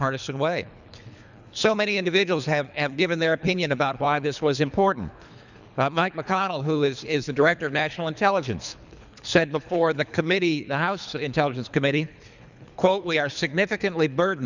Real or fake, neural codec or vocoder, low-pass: fake; codec, 24 kHz, 3 kbps, HILCodec; 7.2 kHz